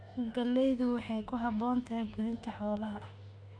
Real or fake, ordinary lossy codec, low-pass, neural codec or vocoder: fake; AAC, 48 kbps; 9.9 kHz; autoencoder, 48 kHz, 32 numbers a frame, DAC-VAE, trained on Japanese speech